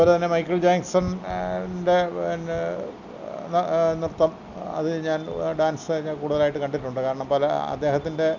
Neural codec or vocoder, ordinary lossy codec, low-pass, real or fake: none; none; 7.2 kHz; real